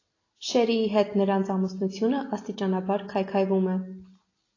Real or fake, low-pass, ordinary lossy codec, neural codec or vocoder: real; 7.2 kHz; AAC, 32 kbps; none